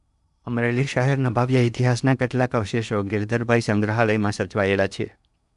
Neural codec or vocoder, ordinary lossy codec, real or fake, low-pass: codec, 16 kHz in and 24 kHz out, 0.8 kbps, FocalCodec, streaming, 65536 codes; none; fake; 10.8 kHz